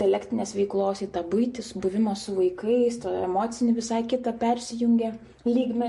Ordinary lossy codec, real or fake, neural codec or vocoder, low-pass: MP3, 48 kbps; real; none; 14.4 kHz